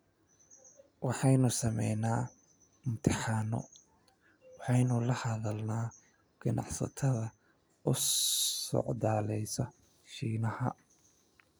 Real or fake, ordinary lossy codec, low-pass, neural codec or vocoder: real; none; none; none